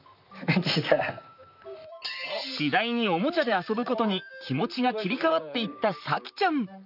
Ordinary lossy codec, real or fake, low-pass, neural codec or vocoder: none; fake; 5.4 kHz; vocoder, 44.1 kHz, 128 mel bands, Pupu-Vocoder